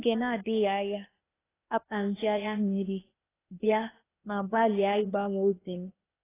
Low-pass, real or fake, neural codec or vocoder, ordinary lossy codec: 3.6 kHz; fake; codec, 16 kHz, 0.8 kbps, ZipCodec; AAC, 16 kbps